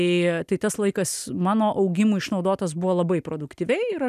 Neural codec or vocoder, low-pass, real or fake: none; 14.4 kHz; real